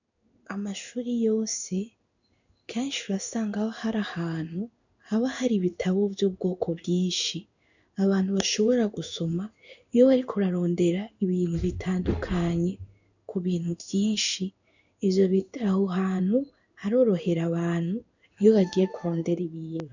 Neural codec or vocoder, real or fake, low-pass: codec, 16 kHz in and 24 kHz out, 1 kbps, XY-Tokenizer; fake; 7.2 kHz